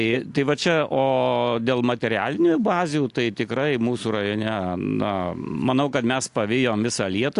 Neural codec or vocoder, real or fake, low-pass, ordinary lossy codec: none; real; 10.8 kHz; AAC, 64 kbps